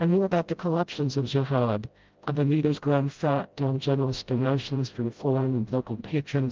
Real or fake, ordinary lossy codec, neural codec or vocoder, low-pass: fake; Opus, 32 kbps; codec, 16 kHz, 0.5 kbps, FreqCodec, smaller model; 7.2 kHz